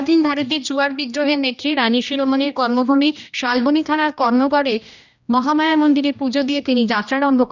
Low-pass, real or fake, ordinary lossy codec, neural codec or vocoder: 7.2 kHz; fake; none; codec, 16 kHz, 1 kbps, X-Codec, HuBERT features, trained on general audio